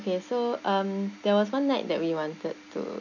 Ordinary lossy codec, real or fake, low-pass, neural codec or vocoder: none; real; 7.2 kHz; none